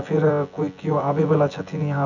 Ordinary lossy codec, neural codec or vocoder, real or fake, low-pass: none; vocoder, 24 kHz, 100 mel bands, Vocos; fake; 7.2 kHz